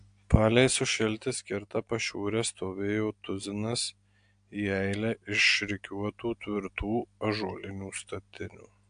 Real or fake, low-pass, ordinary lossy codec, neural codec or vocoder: real; 9.9 kHz; AAC, 64 kbps; none